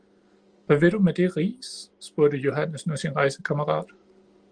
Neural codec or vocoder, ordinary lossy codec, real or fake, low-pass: none; Opus, 24 kbps; real; 9.9 kHz